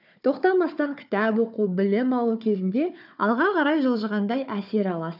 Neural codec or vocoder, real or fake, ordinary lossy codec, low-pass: codec, 16 kHz, 4 kbps, FunCodec, trained on Chinese and English, 50 frames a second; fake; none; 5.4 kHz